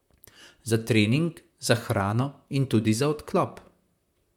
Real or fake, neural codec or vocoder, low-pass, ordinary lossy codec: fake; vocoder, 44.1 kHz, 128 mel bands, Pupu-Vocoder; 19.8 kHz; MP3, 96 kbps